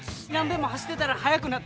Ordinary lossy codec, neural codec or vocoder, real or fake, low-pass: none; none; real; none